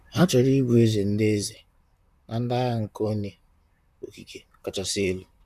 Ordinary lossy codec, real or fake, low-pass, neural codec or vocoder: none; fake; 14.4 kHz; vocoder, 44.1 kHz, 128 mel bands, Pupu-Vocoder